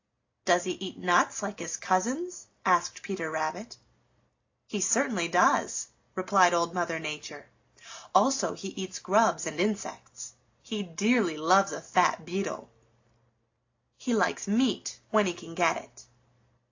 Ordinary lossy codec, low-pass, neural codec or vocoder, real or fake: AAC, 48 kbps; 7.2 kHz; none; real